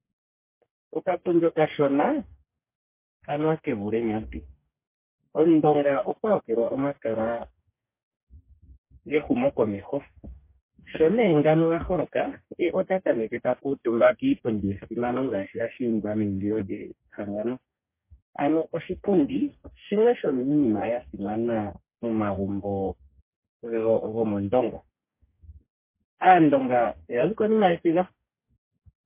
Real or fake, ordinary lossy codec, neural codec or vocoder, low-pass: fake; MP3, 24 kbps; codec, 44.1 kHz, 2.6 kbps, DAC; 3.6 kHz